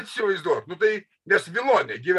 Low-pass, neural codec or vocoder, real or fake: 14.4 kHz; none; real